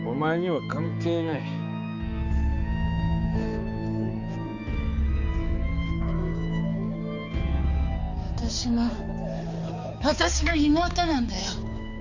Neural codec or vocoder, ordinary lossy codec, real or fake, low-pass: codec, 16 kHz, 4 kbps, X-Codec, HuBERT features, trained on balanced general audio; none; fake; 7.2 kHz